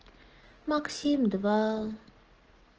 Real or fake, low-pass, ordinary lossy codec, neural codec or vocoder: real; 7.2 kHz; Opus, 16 kbps; none